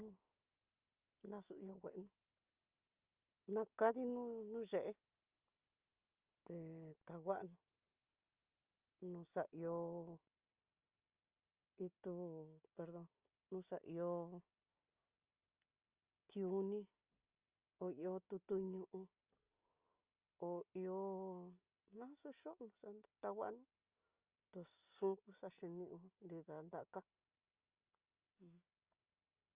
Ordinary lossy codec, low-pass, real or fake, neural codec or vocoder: Opus, 32 kbps; 3.6 kHz; real; none